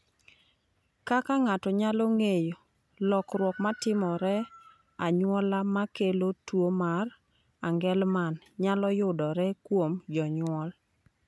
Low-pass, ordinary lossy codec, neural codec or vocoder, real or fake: none; none; none; real